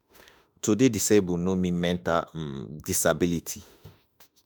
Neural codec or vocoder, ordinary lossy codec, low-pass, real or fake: autoencoder, 48 kHz, 32 numbers a frame, DAC-VAE, trained on Japanese speech; none; none; fake